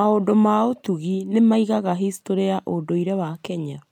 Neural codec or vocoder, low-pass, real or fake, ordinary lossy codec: none; 19.8 kHz; real; MP3, 96 kbps